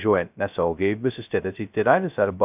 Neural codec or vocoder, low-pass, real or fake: codec, 16 kHz, 0.2 kbps, FocalCodec; 3.6 kHz; fake